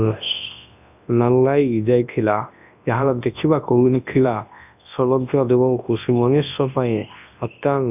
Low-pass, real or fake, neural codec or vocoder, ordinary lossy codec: 3.6 kHz; fake; codec, 24 kHz, 0.9 kbps, WavTokenizer, large speech release; none